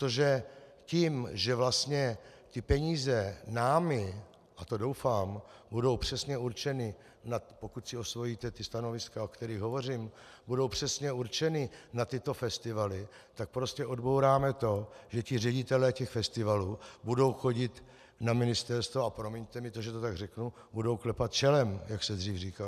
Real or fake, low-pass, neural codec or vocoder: real; 14.4 kHz; none